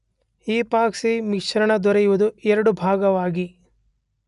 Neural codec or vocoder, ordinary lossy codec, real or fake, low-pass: none; none; real; 10.8 kHz